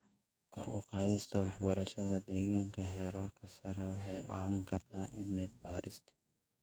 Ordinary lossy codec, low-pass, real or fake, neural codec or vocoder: none; none; fake; codec, 44.1 kHz, 2.6 kbps, DAC